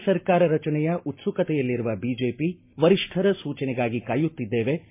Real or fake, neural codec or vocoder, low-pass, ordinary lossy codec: real; none; 3.6 kHz; MP3, 24 kbps